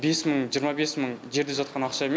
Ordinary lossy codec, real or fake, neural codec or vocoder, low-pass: none; real; none; none